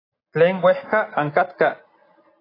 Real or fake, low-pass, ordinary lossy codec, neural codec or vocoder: real; 5.4 kHz; AAC, 24 kbps; none